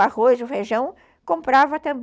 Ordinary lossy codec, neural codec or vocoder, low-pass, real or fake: none; none; none; real